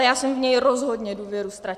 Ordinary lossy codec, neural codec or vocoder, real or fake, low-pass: Opus, 64 kbps; none; real; 14.4 kHz